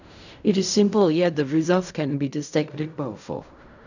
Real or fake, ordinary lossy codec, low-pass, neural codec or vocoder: fake; none; 7.2 kHz; codec, 16 kHz in and 24 kHz out, 0.4 kbps, LongCat-Audio-Codec, fine tuned four codebook decoder